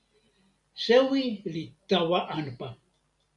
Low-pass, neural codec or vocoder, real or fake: 10.8 kHz; none; real